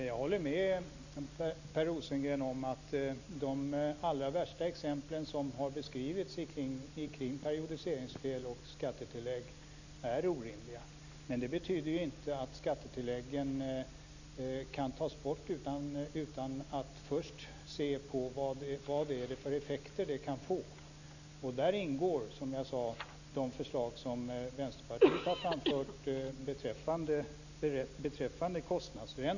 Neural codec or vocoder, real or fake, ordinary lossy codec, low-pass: none; real; none; 7.2 kHz